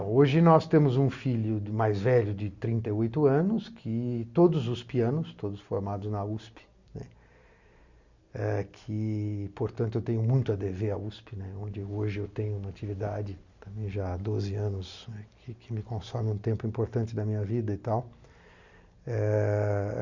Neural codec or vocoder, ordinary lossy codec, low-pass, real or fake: none; Opus, 64 kbps; 7.2 kHz; real